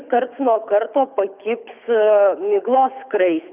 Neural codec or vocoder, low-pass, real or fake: codec, 24 kHz, 6 kbps, HILCodec; 3.6 kHz; fake